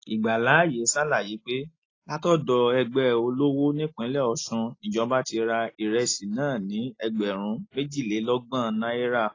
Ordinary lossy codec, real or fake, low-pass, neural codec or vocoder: AAC, 32 kbps; real; 7.2 kHz; none